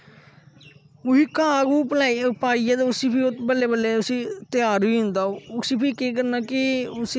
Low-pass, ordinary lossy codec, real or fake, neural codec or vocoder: none; none; real; none